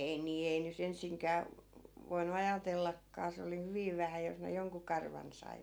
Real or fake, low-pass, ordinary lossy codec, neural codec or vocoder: real; none; none; none